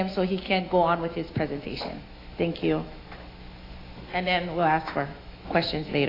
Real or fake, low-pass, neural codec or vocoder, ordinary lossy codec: fake; 5.4 kHz; autoencoder, 48 kHz, 128 numbers a frame, DAC-VAE, trained on Japanese speech; AAC, 24 kbps